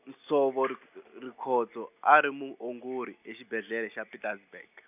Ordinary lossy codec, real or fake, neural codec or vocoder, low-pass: none; real; none; 3.6 kHz